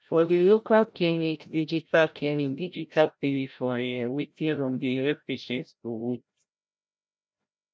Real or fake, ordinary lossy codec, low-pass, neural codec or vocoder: fake; none; none; codec, 16 kHz, 0.5 kbps, FreqCodec, larger model